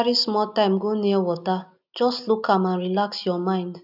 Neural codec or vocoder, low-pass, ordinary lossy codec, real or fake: none; 5.4 kHz; none; real